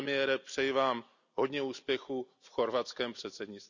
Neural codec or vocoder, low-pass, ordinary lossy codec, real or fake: none; 7.2 kHz; none; real